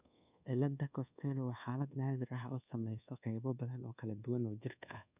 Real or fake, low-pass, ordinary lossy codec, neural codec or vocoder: fake; 3.6 kHz; none; codec, 24 kHz, 1.2 kbps, DualCodec